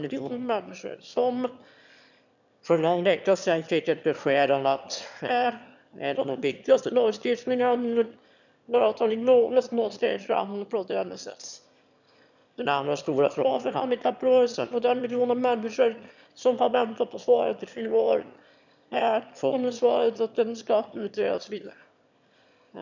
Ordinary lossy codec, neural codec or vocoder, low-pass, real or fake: none; autoencoder, 22.05 kHz, a latent of 192 numbers a frame, VITS, trained on one speaker; 7.2 kHz; fake